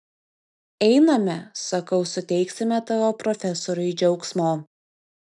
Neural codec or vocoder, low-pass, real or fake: none; 10.8 kHz; real